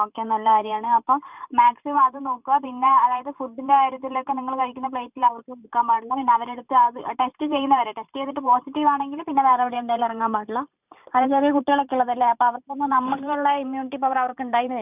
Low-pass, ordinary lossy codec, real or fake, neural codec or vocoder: 3.6 kHz; none; fake; vocoder, 44.1 kHz, 128 mel bands every 512 samples, BigVGAN v2